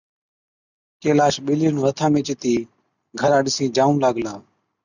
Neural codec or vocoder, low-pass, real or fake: none; 7.2 kHz; real